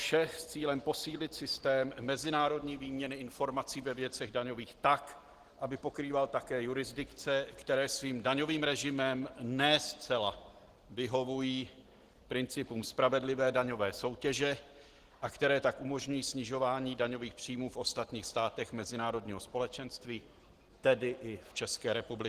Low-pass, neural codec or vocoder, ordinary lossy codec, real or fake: 14.4 kHz; none; Opus, 16 kbps; real